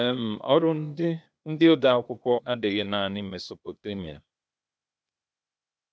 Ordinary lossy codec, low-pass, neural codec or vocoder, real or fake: none; none; codec, 16 kHz, 0.8 kbps, ZipCodec; fake